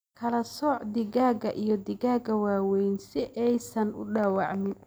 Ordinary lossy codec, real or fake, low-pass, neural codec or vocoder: none; real; none; none